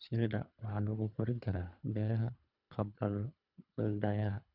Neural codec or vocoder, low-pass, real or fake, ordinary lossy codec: codec, 24 kHz, 3 kbps, HILCodec; 5.4 kHz; fake; none